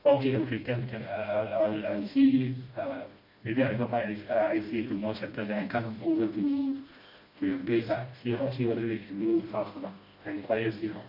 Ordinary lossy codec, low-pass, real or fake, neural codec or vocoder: MP3, 32 kbps; 5.4 kHz; fake; codec, 16 kHz, 1 kbps, FreqCodec, smaller model